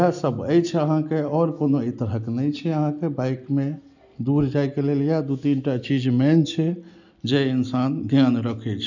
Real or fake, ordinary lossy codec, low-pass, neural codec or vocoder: real; none; 7.2 kHz; none